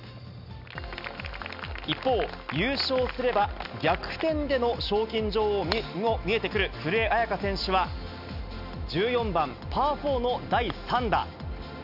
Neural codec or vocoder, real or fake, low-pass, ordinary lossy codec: none; real; 5.4 kHz; none